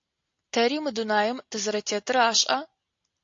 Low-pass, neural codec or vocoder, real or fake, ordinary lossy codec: 7.2 kHz; none; real; AAC, 48 kbps